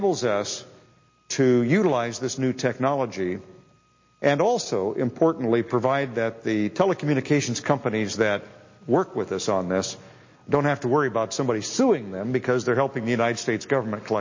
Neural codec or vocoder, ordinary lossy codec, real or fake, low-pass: none; MP3, 32 kbps; real; 7.2 kHz